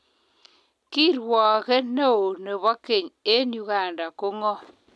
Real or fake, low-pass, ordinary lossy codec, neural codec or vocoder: real; none; none; none